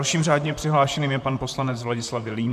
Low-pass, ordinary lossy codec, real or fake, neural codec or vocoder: 14.4 kHz; MP3, 96 kbps; fake; vocoder, 44.1 kHz, 128 mel bands, Pupu-Vocoder